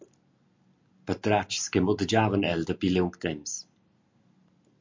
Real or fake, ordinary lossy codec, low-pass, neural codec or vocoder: real; MP3, 48 kbps; 7.2 kHz; none